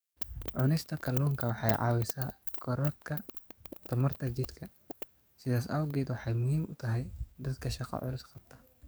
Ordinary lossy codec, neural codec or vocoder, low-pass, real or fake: none; codec, 44.1 kHz, 7.8 kbps, DAC; none; fake